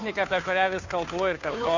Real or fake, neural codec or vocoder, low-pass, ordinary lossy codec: fake; codec, 16 kHz, 2 kbps, FunCodec, trained on Chinese and English, 25 frames a second; 7.2 kHz; Opus, 64 kbps